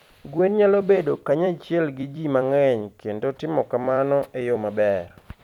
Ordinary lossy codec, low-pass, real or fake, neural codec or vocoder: none; 19.8 kHz; fake; vocoder, 44.1 kHz, 128 mel bands every 256 samples, BigVGAN v2